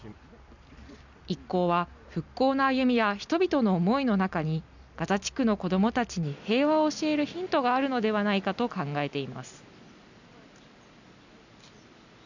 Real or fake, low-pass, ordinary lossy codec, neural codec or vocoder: real; 7.2 kHz; none; none